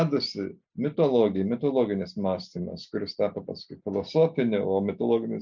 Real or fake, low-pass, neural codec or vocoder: real; 7.2 kHz; none